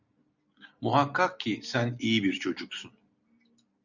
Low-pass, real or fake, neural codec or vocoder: 7.2 kHz; real; none